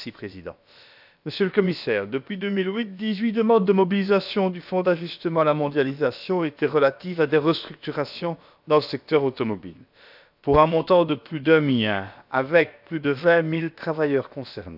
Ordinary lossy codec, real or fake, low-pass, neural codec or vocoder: none; fake; 5.4 kHz; codec, 16 kHz, about 1 kbps, DyCAST, with the encoder's durations